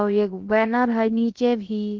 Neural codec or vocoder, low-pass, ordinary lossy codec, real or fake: codec, 16 kHz, about 1 kbps, DyCAST, with the encoder's durations; 7.2 kHz; Opus, 16 kbps; fake